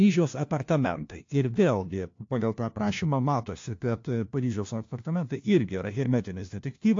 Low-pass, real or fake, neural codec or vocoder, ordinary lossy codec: 7.2 kHz; fake; codec, 16 kHz, 1 kbps, FunCodec, trained on LibriTTS, 50 frames a second; AAC, 48 kbps